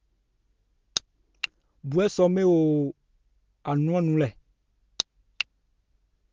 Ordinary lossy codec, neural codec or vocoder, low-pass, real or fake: Opus, 16 kbps; none; 7.2 kHz; real